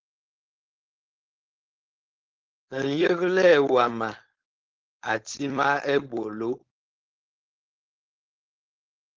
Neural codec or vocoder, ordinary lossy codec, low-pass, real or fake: codec, 16 kHz, 4.8 kbps, FACodec; Opus, 16 kbps; 7.2 kHz; fake